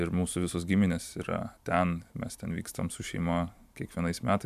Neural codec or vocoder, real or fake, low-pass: vocoder, 44.1 kHz, 128 mel bands every 512 samples, BigVGAN v2; fake; 14.4 kHz